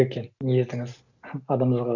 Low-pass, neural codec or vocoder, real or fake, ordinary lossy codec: 7.2 kHz; none; real; none